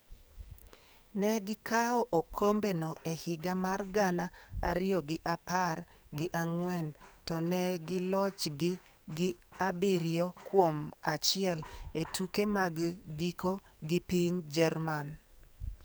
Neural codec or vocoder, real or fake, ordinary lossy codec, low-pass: codec, 44.1 kHz, 2.6 kbps, SNAC; fake; none; none